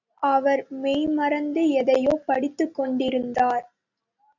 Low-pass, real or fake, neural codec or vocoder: 7.2 kHz; real; none